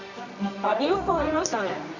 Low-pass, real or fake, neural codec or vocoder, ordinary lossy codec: 7.2 kHz; fake; codec, 24 kHz, 0.9 kbps, WavTokenizer, medium music audio release; Opus, 64 kbps